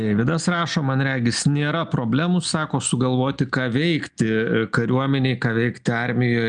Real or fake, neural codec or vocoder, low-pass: real; none; 9.9 kHz